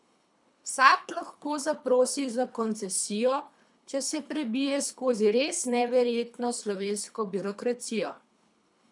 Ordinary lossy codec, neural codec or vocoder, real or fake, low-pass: none; codec, 24 kHz, 3 kbps, HILCodec; fake; 10.8 kHz